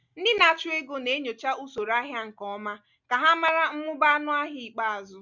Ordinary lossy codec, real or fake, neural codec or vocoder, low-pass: none; real; none; 7.2 kHz